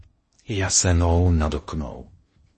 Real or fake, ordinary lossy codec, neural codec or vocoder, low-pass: fake; MP3, 32 kbps; codec, 16 kHz in and 24 kHz out, 0.8 kbps, FocalCodec, streaming, 65536 codes; 10.8 kHz